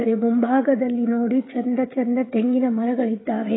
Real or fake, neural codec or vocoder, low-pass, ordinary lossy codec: fake; codec, 16 kHz, 16 kbps, FreqCodec, smaller model; 7.2 kHz; AAC, 16 kbps